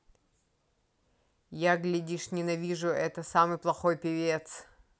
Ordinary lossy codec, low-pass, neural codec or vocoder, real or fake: none; none; none; real